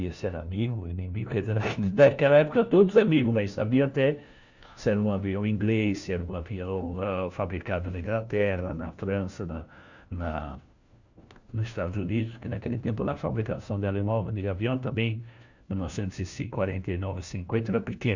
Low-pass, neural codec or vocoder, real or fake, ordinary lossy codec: 7.2 kHz; codec, 16 kHz, 1 kbps, FunCodec, trained on LibriTTS, 50 frames a second; fake; Opus, 64 kbps